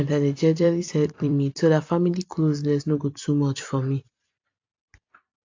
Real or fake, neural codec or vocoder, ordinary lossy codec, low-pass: fake; vocoder, 44.1 kHz, 128 mel bands every 256 samples, BigVGAN v2; AAC, 48 kbps; 7.2 kHz